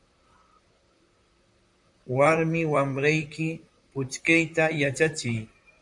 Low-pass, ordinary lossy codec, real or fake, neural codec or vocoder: 10.8 kHz; MP3, 64 kbps; fake; vocoder, 44.1 kHz, 128 mel bands, Pupu-Vocoder